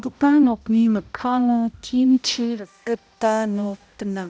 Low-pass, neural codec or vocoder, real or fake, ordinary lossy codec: none; codec, 16 kHz, 0.5 kbps, X-Codec, HuBERT features, trained on balanced general audio; fake; none